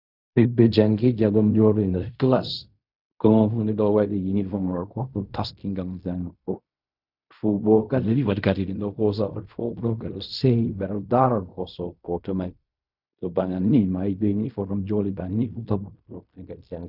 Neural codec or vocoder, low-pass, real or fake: codec, 16 kHz in and 24 kHz out, 0.4 kbps, LongCat-Audio-Codec, fine tuned four codebook decoder; 5.4 kHz; fake